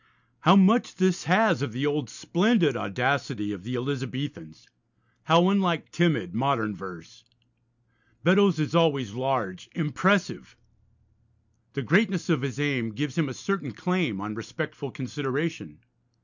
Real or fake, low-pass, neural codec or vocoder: real; 7.2 kHz; none